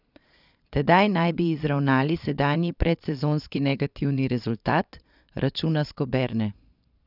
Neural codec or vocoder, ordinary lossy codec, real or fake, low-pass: vocoder, 22.05 kHz, 80 mel bands, Vocos; none; fake; 5.4 kHz